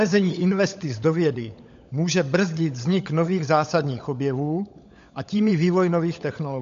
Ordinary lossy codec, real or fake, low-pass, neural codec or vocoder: MP3, 48 kbps; fake; 7.2 kHz; codec, 16 kHz, 16 kbps, FunCodec, trained on LibriTTS, 50 frames a second